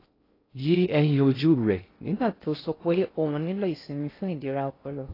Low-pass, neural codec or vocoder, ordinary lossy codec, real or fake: 5.4 kHz; codec, 16 kHz in and 24 kHz out, 0.6 kbps, FocalCodec, streaming, 2048 codes; AAC, 24 kbps; fake